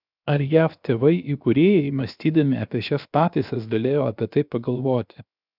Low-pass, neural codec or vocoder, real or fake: 5.4 kHz; codec, 16 kHz, 0.7 kbps, FocalCodec; fake